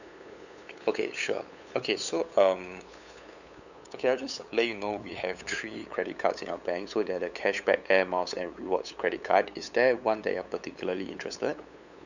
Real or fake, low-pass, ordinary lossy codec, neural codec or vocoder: fake; 7.2 kHz; none; codec, 16 kHz, 8 kbps, FunCodec, trained on LibriTTS, 25 frames a second